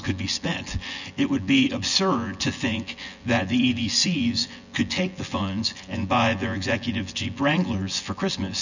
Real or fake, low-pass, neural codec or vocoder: fake; 7.2 kHz; vocoder, 24 kHz, 100 mel bands, Vocos